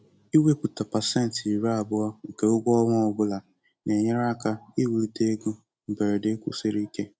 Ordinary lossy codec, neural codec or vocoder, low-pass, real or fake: none; none; none; real